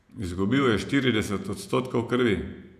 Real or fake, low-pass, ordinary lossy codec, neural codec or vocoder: fake; 14.4 kHz; none; vocoder, 48 kHz, 128 mel bands, Vocos